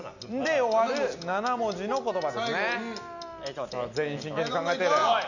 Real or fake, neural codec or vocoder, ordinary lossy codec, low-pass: real; none; none; 7.2 kHz